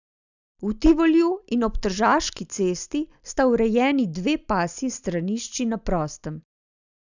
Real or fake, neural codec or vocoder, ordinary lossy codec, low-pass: real; none; none; 7.2 kHz